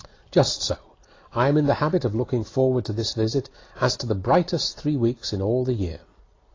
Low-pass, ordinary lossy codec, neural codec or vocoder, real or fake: 7.2 kHz; AAC, 32 kbps; none; real